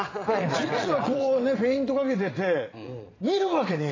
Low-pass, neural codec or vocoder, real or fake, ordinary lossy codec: 7.2 kHz; vocoder, 22.05 kHz, 80 mel bands, Vocos; fake; AAC, 32 kbps